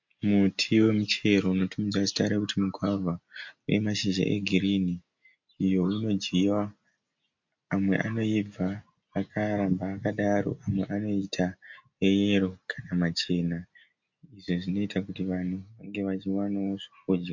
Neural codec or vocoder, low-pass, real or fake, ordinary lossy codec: none; 7.2 kHz; real; MP3, 48 kbps